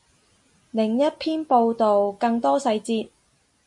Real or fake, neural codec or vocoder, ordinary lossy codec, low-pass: real; none; MP3, 48 kbps; 10.8 kHz